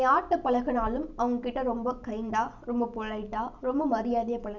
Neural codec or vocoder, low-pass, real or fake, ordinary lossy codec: none; 7.2 kHz; real; none